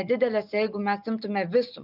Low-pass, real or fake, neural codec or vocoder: 5.4 kHz; real; none